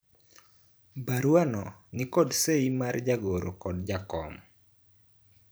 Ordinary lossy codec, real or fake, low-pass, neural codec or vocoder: none; fake; none; vocoder, 44.1 kHz, 128 mel bands every 512 samples, BigVGAN v2